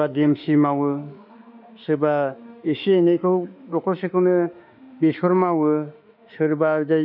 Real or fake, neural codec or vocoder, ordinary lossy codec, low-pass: fake; autoencoder, 48 kHz, 32 numbers a frame, DAC-VAE, trained on Japanese speech; none; 5.4 kHz